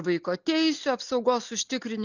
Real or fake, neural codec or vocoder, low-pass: real; none; 7.2 kHz